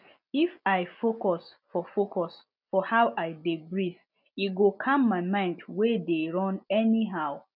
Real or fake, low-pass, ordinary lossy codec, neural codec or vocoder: real; 5.4 kHz; none; none